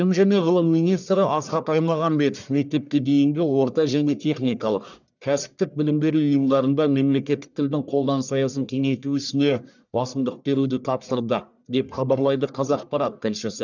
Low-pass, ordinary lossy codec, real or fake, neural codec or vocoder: 7.2 kHz; none; fake; codec, 44.1 kHz, 1.7 kbps, Pupu-Codec